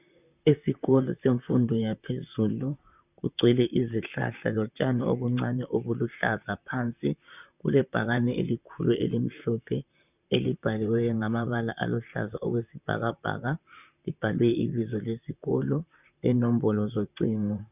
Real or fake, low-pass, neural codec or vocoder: fake; 3.6 kHz; vocoder, 44.1 kHz, 128 mel bands, Pupu-Vocoder